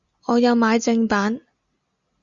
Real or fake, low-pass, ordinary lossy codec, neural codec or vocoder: real; 7.2 kHz; Opus, 64 kbps; none